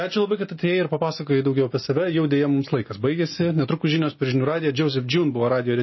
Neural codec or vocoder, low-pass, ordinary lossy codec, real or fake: none; 7.2 kHz; MP3, 24 kbps; real